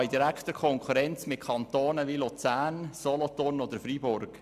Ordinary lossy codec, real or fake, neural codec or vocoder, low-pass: none; real; none; 14.4 kHz